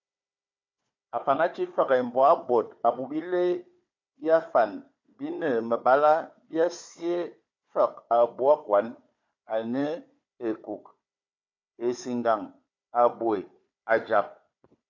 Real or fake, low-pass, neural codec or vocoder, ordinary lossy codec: fake; 7.2 kHz; codec, 16 kHz, 4 kbps, FunCodec, trained on Chinese and English, 50 frames a second; MP3, 48 kbps